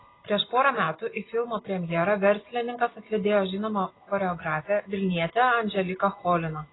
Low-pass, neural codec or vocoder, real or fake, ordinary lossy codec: 7.2 kHz; none; real; AAC, 16 kbps